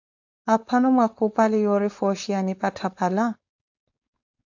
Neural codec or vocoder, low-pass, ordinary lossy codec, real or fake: codec, 16 kHz, 4.8 kbps, FACodec; 7.2 kHz; AAC, 48 kbps; fake